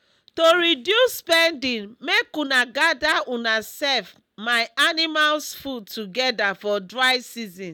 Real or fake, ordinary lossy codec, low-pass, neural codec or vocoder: real; none; none; none